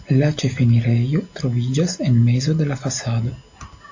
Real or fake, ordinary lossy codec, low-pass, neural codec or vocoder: real; AAC, 48 kbps; 7.2 kHz; none